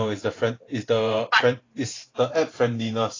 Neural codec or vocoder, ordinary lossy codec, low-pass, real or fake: vocoder, 44.1 kHz, 128 mel bands every 512 samples, BigVGAN v2; AAC, 32 kbps; 7.2 kHz; fake